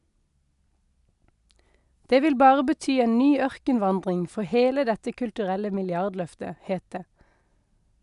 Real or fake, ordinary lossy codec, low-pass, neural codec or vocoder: real; none; 10.8 kHz; none